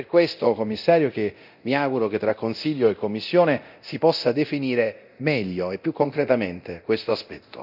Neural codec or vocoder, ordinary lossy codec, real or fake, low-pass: codec, 24 kHz, 0.9 kbps, DualCodec; none; fake; 5.4 kHz